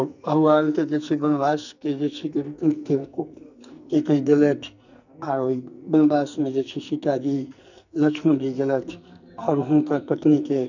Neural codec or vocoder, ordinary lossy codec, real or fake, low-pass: codec, 44.1 kHz, 2.6 kbps, SNAC; none; fake; 7.2 kHz